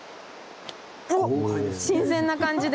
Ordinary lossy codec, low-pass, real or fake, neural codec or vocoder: none; none; real; none